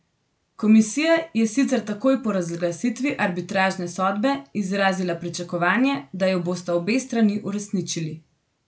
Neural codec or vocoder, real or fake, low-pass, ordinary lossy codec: none; real; none; none